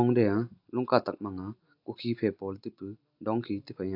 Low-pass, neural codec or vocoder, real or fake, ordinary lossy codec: 5.4 kHz; none; real; AAC, 48 kbps